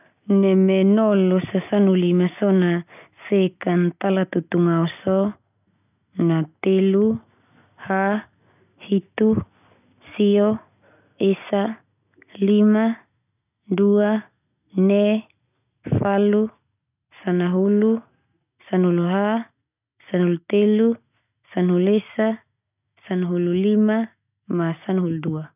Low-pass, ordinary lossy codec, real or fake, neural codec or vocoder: 3.6 kHz; none; real; none